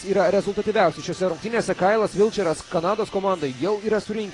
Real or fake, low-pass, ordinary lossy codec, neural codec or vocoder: real; 10.8 kHz; AAC, 32 kbps; none